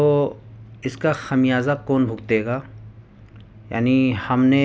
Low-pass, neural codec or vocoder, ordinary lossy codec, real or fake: none; none; none; real